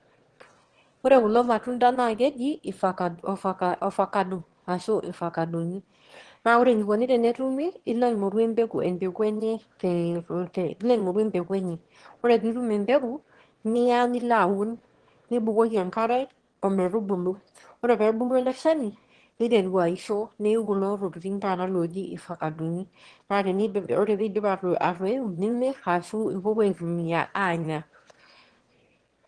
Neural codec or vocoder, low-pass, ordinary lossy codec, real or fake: autoencoder, 22.05 kHz, a latent of 192 numbers a frame, VITS, trained on one speaker; 9.9 kHz; Opus, 16 kbps; fake